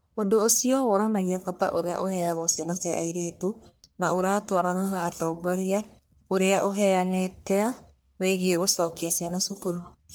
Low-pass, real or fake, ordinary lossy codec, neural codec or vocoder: none; fake; none; codec, 44.1 kHz, 1.7 kbps, Pupu-Codec